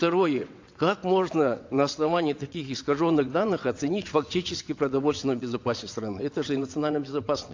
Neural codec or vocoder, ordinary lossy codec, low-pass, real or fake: vocoder, 22.05 kHz, 80 mel bands, WaveNeXt; AAC, 48 kbps; 7.2 kHz; fake